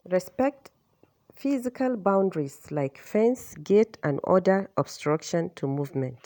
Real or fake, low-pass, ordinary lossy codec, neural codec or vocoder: real; none; none; none